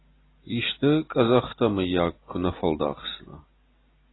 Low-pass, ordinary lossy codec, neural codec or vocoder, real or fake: 7.2 kHz; AAC, 16 kbps; none; real